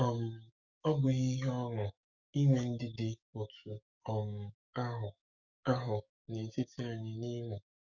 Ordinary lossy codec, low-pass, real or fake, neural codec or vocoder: Opus, 64 kbps; 7.2 kHz; fake; codec, 44.1 kHz, 7.8 kbps, DAC